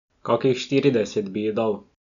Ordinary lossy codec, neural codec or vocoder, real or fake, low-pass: none; none; real; 7.2 kHz